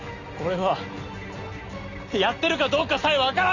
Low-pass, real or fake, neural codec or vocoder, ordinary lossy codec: 7.2 kHz; real; none; none